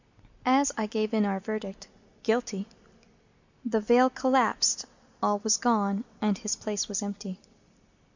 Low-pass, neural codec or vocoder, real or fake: 7.2 kHz; none; real